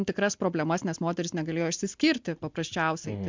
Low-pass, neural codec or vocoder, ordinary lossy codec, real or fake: 7.2 kHz; none; MP3, 64 kbps; real